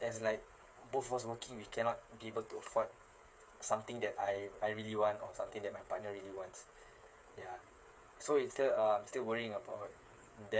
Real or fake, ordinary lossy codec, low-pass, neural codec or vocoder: fake; none; none; codec, 16 kHz, 8 kbps, FreqCodec, smaller model